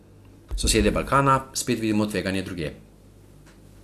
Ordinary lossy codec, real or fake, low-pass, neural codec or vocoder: AAC, 64 kbps; real; 14.4 kHz; none